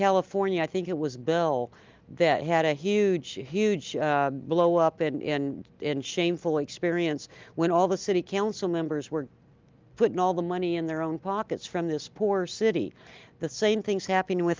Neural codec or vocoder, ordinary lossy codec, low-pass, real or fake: none; Opus, 24 kbps; 7.2 kHz; real